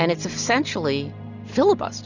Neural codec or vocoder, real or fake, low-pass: none; real; 7.2 kHz